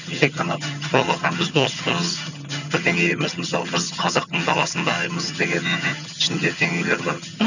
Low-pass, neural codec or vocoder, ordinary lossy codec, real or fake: 7.2 kHz; vocoder, 22.05 kHz, 80 mel bands, HiFi-GAN; none; fake